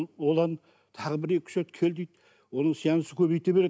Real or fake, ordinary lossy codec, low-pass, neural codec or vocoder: real; none; none; none